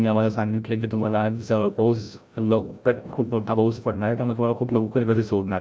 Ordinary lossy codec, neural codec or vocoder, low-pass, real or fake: none; codec, 16 kHz, 0.5 kbps, FreqCodec, larger model; none; fake